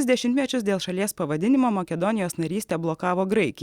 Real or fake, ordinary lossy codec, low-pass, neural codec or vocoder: real; Opus, 64 kbps; 19.8 kHz; none